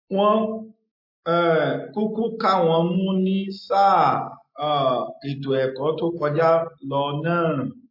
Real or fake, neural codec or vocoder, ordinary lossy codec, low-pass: real; none; MP3, 32 kbps; 5.4 kHz